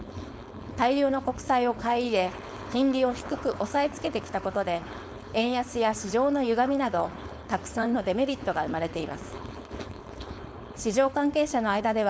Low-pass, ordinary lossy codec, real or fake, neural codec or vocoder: none; none; fake; codec, 16 kHz, 4.8 kbps, FACodec